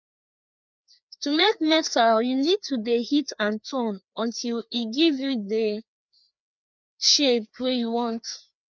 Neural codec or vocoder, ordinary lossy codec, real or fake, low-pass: codec, 16 kHz, 2 kbps, FreqCodec, larger model; none; fake; 7.2 kHz